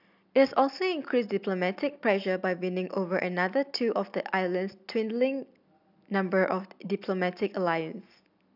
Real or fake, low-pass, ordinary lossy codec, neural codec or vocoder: real; 5.4 kHz; none; none